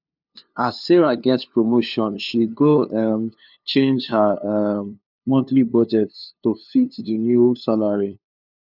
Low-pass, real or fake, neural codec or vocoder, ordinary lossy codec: 5.4 kHz; fake; codec, 16 kHz, 2 kbps, FunCodec, trained on LibriTTS, 25 frames a second; none